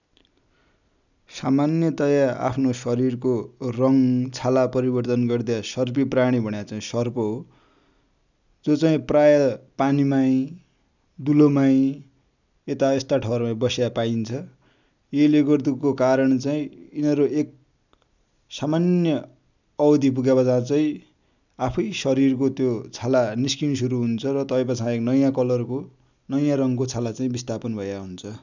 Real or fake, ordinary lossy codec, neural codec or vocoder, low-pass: real; none; none; 7.2 kHz